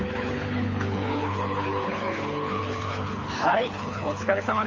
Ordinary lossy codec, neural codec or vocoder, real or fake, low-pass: Opus, 32 kbps; codec, 24 kHz, 6 kbps, HILCodec; fake; 7.2 kHz